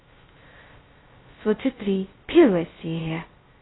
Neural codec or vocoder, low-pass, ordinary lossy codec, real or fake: codec, 16 kHz, 0.2 kbps, FocalCodec; 7.2 kHz; AAC, 16 kbps; fake